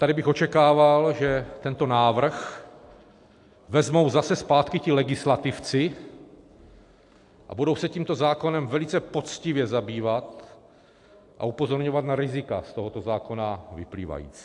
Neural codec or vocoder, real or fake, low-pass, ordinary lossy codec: none; real; 10.8 kHz; AAC, 64 kbps